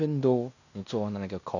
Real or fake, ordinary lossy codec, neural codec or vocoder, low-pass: fake; none; codec, 16 kHz in and 24 kHz out, 0.9 kbps, LongCat-Audio-Codec, fine tuned four codebook decoder; 7.2 kHz